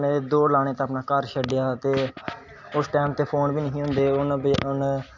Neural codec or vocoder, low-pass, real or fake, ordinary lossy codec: none; 7.2 kHz; real; none